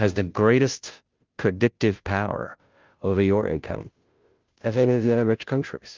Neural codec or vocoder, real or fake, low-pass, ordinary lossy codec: codec, 16 kHz, 0.5 kbps, FunCodec, trained on Chinese and English, 25 frames a second; fake; 7.2 kHz; Opus, 24 kbps